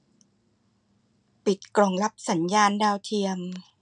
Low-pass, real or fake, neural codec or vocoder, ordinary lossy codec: 9.9 kHz; real; none; none